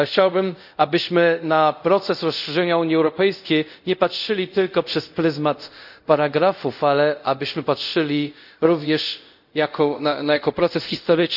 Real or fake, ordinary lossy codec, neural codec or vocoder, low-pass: fake; none; codec, 24 kHz, 0.5 kbps, DualCodec; 5.4 kHz